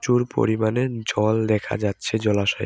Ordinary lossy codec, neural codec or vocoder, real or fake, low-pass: none; none; real; none